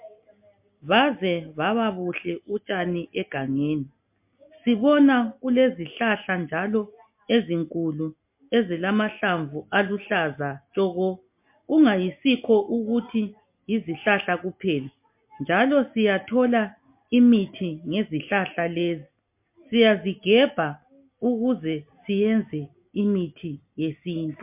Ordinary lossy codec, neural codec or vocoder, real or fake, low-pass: MP3, 32 kbps; none; real; 3.6 kHz